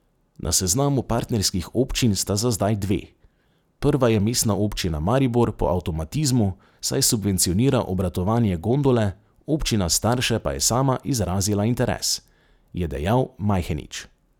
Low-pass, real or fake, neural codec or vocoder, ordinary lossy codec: 19.8 kHz; real; none; none